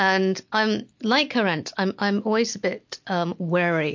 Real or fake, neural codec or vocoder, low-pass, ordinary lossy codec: real; none; 7.2 kHz; MP3, 48 kbps